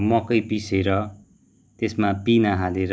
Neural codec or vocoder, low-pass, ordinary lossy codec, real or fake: none; none; none; real